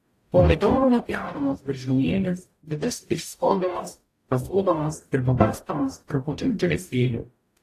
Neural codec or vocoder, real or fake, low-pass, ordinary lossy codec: codec, 44.1 kHz, 0.9 kbps, DAC; fake; 14.4 kHz; AAC, 64 kbps